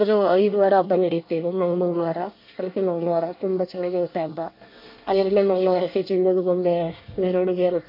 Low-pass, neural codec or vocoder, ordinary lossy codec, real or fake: 5.4 kHz; codec, 24 kHz, 1 kbps, SNAC; MP3, 32 kbps; fake